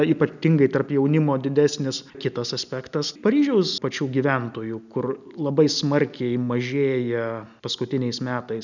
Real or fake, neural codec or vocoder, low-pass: real; none; 7.2 kHz